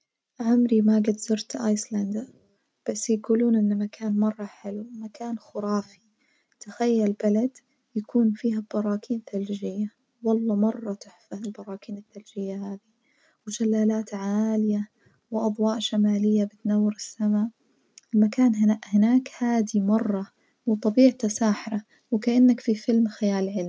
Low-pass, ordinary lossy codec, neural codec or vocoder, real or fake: none; none; none; real